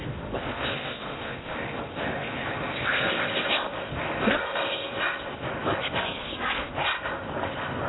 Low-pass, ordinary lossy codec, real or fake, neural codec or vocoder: 7.2 kHz; AAC, 16 kbps; fake; codec, 16 kHz in and 24 kHz out, 0.6 kbps, FocalCodec, streaming, 2048 codes